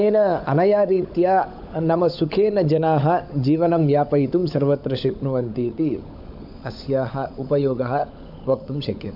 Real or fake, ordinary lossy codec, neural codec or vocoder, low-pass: fake; AAC, 48 kbps; codec, 16 kHz, 16 kbps, FunCodec, trained on LibriTTS, 50 frames a second; 5.4 kHz